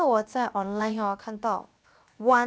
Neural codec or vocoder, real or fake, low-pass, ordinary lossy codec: codec, 16 kHz, 0.7 kbps, FocalCodec; fake; none; none